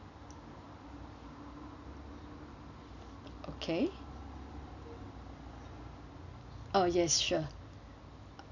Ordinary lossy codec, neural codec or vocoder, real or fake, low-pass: none; none; real; 7.2 kHz